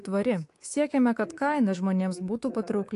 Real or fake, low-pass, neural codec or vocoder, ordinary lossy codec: fake; 10.8 kHz; codec, 24 kHz, 3.1 kbps, DualCodec; AAC, 48 kbps